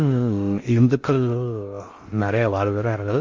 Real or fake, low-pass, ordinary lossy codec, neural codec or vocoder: fake; 7.2 kHz; Opus, 32 kbps; codec, 16 kHz in and 24 kHz out, 0.6 kbps, FocalCodec, streaming, 2048 codes